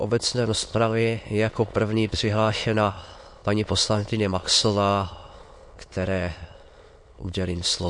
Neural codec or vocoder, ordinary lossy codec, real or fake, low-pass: autoencoder, 22.05 kHz, a latent of 192 numbers a frame, VITS, trained on many speakers; MP3, 48 kbps; fake; 9.9 kHz